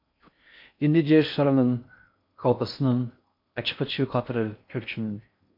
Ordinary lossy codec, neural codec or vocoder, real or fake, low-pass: MP3, 48 kbps; codec, 16 kHz in and 24 kHz out, 0.6 kbps, FocalCodec, streaming, 2048 codes; fake; 5.4 kHz